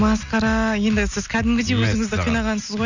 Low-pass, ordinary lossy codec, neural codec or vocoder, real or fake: 7.2 kHz; none; none; real